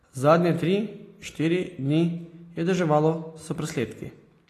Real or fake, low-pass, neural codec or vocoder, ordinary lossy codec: real; 14.4 kHz; none; AAC, 48 kbps